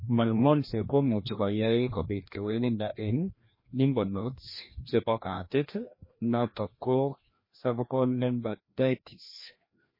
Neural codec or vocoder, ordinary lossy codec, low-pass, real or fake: codec, 16 kHz, 1 kbps, FreqCodec, larger model; MP3, 24 kbps; 5.4 kHz; fake